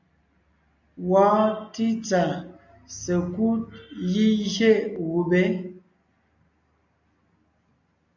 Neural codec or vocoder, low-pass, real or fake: none; 7.2 kHz; real